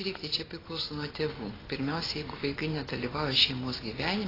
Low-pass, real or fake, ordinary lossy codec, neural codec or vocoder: 5.4 kHz; real; AAC, 24 kbps; none